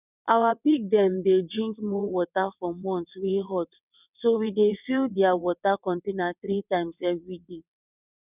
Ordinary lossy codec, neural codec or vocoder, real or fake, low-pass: none; vocoder, 22.05 kHz, 80 mel bands, WaveNeXt; fake; 3.6 kHz